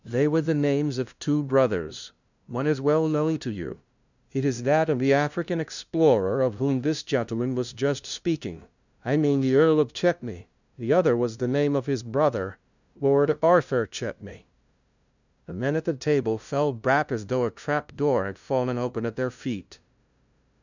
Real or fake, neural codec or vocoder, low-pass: fake; codec, 16 kHz, 0.5 kbps, FunCodec, trained on LibriTTS, 25 frames a second; 7.2 kHz